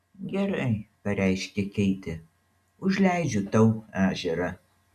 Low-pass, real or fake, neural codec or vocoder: 14.4 kHz; real; none